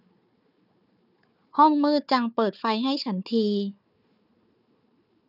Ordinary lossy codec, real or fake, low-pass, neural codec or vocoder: none; fake; 5.4 kHz; codec, 16 kHz, 4 kbps, FunCodec, trained on Chinese and English, 50 frames a second